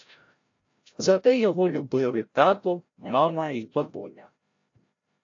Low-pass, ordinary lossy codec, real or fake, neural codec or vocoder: 7.2 kHz; AAC, 48 kbps; fake; codec, 16 kHz, 0.5 kbps, FreqCodec, larger model